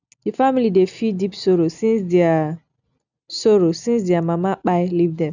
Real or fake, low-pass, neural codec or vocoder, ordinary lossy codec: real; 7.2 kHz; none; none